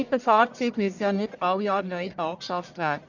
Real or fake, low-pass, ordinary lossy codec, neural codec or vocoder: fake; 7.2 kHz; none; codec, 44.1 kHz, 1.7 kbps, Pupu-Codec